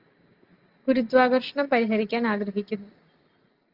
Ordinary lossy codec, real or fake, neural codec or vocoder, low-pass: Opus, 24 kbps; real; none; 5.4 kHz